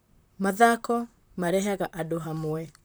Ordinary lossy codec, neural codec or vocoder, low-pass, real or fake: none; vocoder, 44.1 kHz, 128 mel bands, Pupu-Vocoder; none; fake